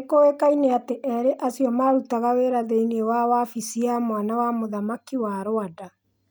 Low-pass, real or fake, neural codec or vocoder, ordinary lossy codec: none; real; none; none